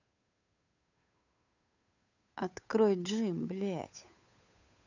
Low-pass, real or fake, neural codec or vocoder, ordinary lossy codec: 7.2 kHz; fake; codec, 16 kHz, 8 kbps, FunCodec, trained on Chinese and English, 25 frames a second; none